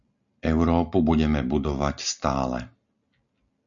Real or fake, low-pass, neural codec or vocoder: real; 7.2 kHz; none